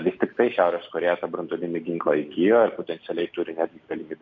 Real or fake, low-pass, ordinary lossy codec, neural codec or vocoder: real; 7.2 kHz; MP3, 48 kbps; none